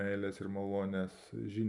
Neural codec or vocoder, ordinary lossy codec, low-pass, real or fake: none; MP3, 96 kbps; 10.8 kHz; real